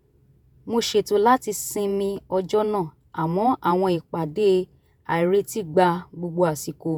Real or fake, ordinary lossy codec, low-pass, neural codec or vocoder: fake; none; none; vocoder, 48 kHz, 128 mel bands, Vocos